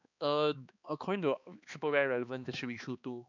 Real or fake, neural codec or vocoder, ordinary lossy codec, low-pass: fake; codec, 16 kHz, 2 kbps, X-Codec, HuBERT features, trained on balanced general audio; none; 7.2 kHz